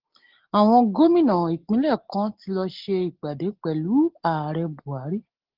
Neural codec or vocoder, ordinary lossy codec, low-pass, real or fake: none; Opus, 16 kbps; 5.4 kHz; real